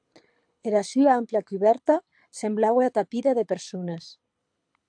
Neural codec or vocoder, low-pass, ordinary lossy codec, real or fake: codec, 24 kHz, 6 kbps, HILCodec; 9.9 kHz; AAC, 64 kbps; fake